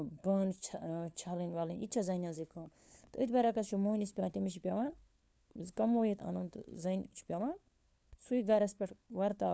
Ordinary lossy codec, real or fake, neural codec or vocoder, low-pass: none; fake; codec, 16 kHz, 4 kbps, FunCodec, trained on LibriTTS, 50 frames a second; none